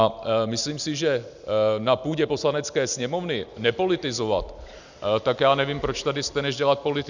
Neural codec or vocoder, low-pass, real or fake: none; 7.2 kHz; real